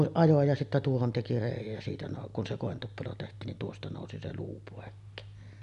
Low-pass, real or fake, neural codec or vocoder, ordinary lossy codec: 10.8 kHz; real; none; none